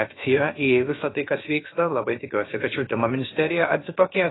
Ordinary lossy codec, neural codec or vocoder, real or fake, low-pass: AAC, 16 kbps; codec, 16 kHz, about 1 kbps, DyCAST, with the encoder's durations; fake; 7.2 kHz